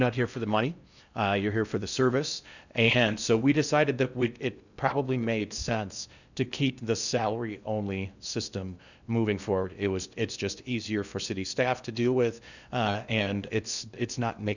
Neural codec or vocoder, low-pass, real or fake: codec, 16 kHz in and 24 kHz out, 0.6 kbps, FocalCodec, streaming, 4096 codes; 7.2 kHz; fake